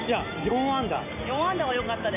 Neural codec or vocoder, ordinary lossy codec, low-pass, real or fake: none; none; 3.6 kHz; real